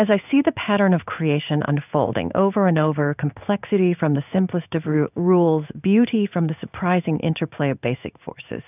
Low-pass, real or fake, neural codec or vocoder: 3.6 kHz; fake; codec, 16 kHz in and 24 kHz out, 1 kbps, XY-Tokenizer